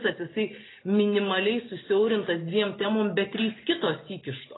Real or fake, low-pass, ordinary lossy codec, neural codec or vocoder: real; 7.2 kHz; AAC, 16 kbps; none